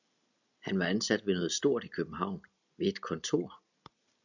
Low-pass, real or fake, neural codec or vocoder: 7.2 kHz; real; none